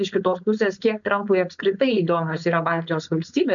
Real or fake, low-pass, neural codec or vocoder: fake; 7.2 kHz; codec, 16 kHz, 4.8 kbps, FACodec